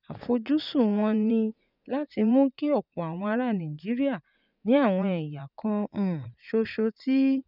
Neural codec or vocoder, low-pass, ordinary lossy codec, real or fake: vocoder, 44.1 kHz, 80 mel bands, Vocos; 5.4 kHz; none; fake